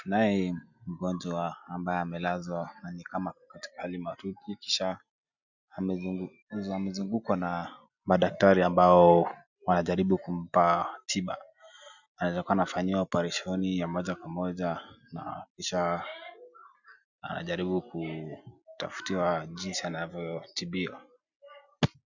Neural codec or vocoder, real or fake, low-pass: none; real; 7.2 kHz